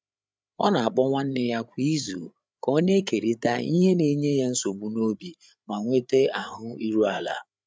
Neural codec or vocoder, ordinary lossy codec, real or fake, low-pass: codec, 16 kHz, 8 kbps, FreqCodec, larger model; none; fake; none